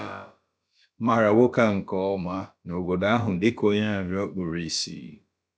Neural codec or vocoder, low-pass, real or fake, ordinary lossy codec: codec, 16 kHz, about 1 kbps, DyCAST, with the encoder's durations; none; fake; none